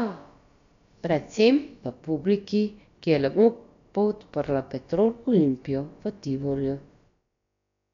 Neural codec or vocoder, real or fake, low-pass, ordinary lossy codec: codec, 16 kHz, about 1 kbps, DyCAST, with the encoder's durations; fake; 7.2 kHz; MP3, 64 kbps